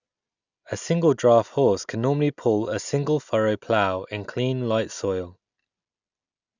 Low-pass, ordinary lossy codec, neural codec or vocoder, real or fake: 7.2 kHz; none; none; real